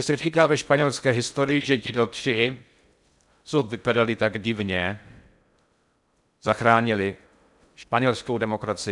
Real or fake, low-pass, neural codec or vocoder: fake; 10.8 kHz; codec, 16 kHz in and 24 kHz out, 0.6 kbps, FocalCodec, streaming, 2048 codes